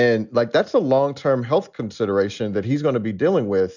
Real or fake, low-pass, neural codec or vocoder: real; 7.2 kHz; none